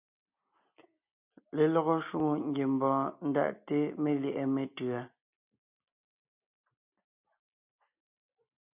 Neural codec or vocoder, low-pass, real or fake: none; 3.6 kHz; real